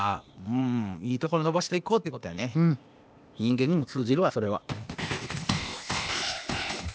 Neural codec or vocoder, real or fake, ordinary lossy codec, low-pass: codec, 16 kHz, 0.8 kbps, ZipCodec; fake; none; none